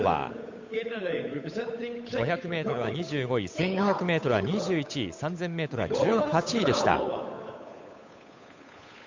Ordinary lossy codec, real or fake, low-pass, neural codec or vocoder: MP3, 64 kbps; fake; 7.2 kHz; codec, 16 kHz, 8 kbps, FunCodec, trained on Chinese and English, 25 frames a second